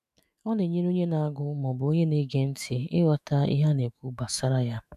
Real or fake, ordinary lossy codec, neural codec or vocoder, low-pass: fake; none; autoencoder, 48 kHz, 128 numbers a frame, DAC-VAE, trained on Japanese speech; 14.4 kHz